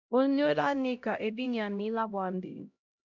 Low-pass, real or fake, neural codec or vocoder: 7.2 kHz; fake; codec, 16 kHz, 0.5 kbps, X-Codec, HuBERT features, trained on LibriSpeech